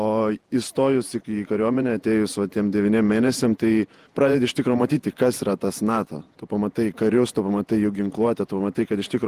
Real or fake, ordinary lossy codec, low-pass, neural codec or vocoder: fake; Opus, 24 kbps; 14.4 kHz; vocoder, 48 kHz, 128 mel bands, Vocos